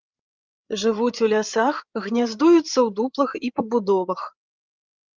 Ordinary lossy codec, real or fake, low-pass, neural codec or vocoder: Opus, 32 kbps; fake; 7.2 kHz; codec, 16 kHz, 8 kbps, FreqCodec, larger model